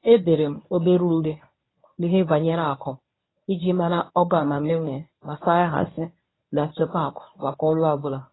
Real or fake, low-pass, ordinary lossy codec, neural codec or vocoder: fake; 7.2 kHz; AAC, 16 kbps; codec, 24 kHz, 0.9 kbps, WavTokenizer, medium speech release version 1